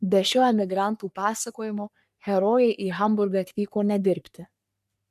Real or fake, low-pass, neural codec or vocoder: fake; 14.4 kHz; codec, 44.1 kHz, 3.4 kbps, Pupu-Codec